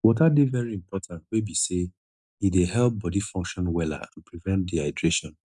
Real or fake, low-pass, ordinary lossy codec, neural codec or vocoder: real; none; none; none